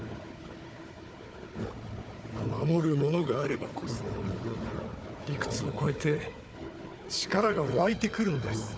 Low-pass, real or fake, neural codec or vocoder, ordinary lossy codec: none; fake; codec, 16 kHz, 4 kbps, FunCodec, trained on Chinese and English, 50 frames a second; none